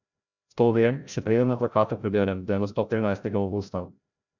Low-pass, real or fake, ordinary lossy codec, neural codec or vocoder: 7.2 kHz; fake; none; codec, 16 kHz, 0.5 kbps, FreqCodec, larger model